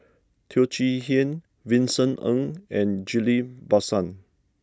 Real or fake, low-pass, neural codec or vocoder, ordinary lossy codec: real; none; none; none